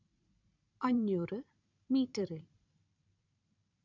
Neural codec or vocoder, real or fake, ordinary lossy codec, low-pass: vocoder, 44.1 kHz, 128 mel bands every 512 samples, BigVGAN v2; fake; none; 7.2 kHz